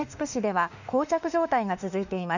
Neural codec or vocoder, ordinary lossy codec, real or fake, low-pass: autoencoder, 48 kHz, 32 numbers a frame, DAC-VAE, trained on Japanese speech; none; fake; 7.2 kHz